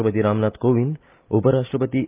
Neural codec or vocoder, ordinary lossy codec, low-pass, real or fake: none; Opus, 32 kbps; 3.6 kHz; real